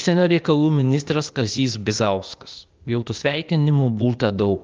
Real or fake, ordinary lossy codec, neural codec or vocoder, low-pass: fake; Opus, 32 kbps; codec, 16 kHz, 0.8 kbps, ZipCodec; 7.2 kHz